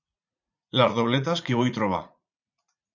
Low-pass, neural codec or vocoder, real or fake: 7.2 kHz; vocoder, 44.1 kHz, 80 mel bands, Vocos; fake